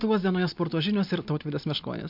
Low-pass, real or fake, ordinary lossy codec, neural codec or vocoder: 5.4 kHz; real; MP3, 48 kbps; none